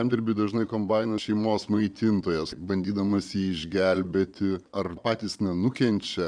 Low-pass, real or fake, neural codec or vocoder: 9.9 kHz; fake; vocoder, 22.05 kHz, 80 mel bands, Vocos